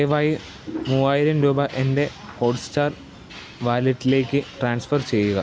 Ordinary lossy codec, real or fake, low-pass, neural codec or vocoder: none; real; none; none